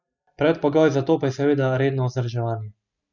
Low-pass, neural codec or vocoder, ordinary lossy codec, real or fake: 7.2 kHz; none; none; real